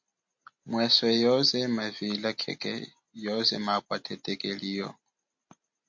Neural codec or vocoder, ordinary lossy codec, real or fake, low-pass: none; MP3, 48 kbps; real; 7.2 kHz